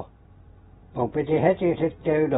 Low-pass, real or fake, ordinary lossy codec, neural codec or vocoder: 19.8 kHz; fake; AAC, 16 kbps; vocoder, 44.1 kHz, 128 mel bands every 512 samples, BigVGAN v2